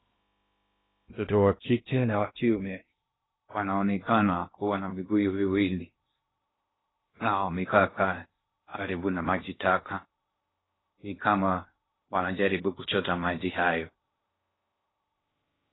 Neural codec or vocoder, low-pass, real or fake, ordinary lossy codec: codec, 16 kHz in and 24 kHz out, 0.6 kbps, FocalCodec, streaming, 2048 codes; 7.2 kHz; fake; AAC, 16 kbps